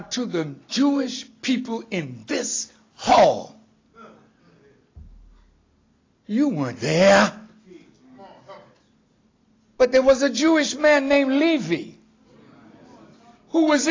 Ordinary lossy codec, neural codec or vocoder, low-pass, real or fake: AAC, 32 kbps; none; 7.2 kHz; real